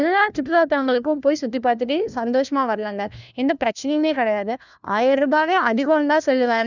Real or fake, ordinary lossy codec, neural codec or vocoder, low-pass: fake; none; codec, 16 kHz, 1 kbps, FunCodec, trained on LibriTTS, 50 frames a second; 7.2 kHz